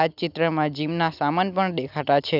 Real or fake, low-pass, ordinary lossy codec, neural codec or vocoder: real; 5.4 kHz; none; none